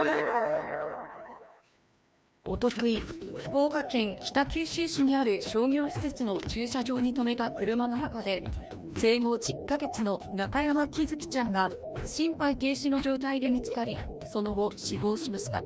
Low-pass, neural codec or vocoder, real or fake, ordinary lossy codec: none; codec, 16 kHz, 1 kbps, FreqCodec, larger model; fake; none